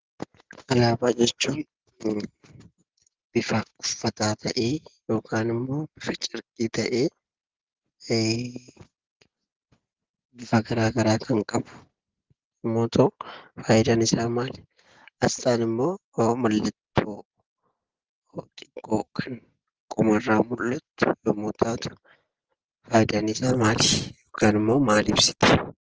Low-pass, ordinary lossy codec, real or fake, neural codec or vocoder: 7.2 kHz; Opus, 16 kbps; real; none